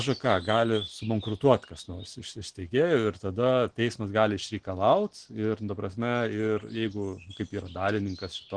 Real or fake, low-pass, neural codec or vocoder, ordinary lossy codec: real; 9.9 kHz; none; Opus, 16 kbps